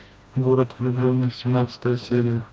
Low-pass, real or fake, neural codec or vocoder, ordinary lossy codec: none; fake; codec, 16 kHz, 1 kbps, FreqCodec, smaller model; none